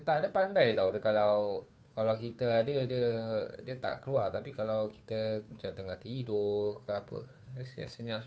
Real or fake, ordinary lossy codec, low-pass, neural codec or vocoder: fake; none; none; codec, 16 kHz, 2 kbps, FunCodec, trained on Chinese and English, 25 frames a second